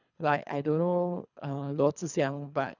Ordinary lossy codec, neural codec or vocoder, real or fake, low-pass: none; codec, 24 kHz, 3 kbps, HILCodec; fake; 7.2 kHz